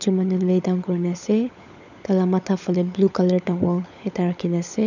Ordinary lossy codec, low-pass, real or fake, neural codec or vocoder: none; 7.2 kHz; fake; codec, 16 kHz, 16 kbps, FunCodec, trained on LibriTTS, 50 frames a second